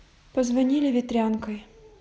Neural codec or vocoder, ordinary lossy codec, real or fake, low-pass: none; none; real; none